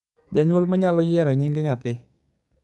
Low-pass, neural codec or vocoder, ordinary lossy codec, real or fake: 10.8 kHz; codec, 44.1 kHz, 2.6 kbps, SNAC; none; fake